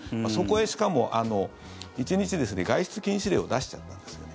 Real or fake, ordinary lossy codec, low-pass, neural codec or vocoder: real; none; none; none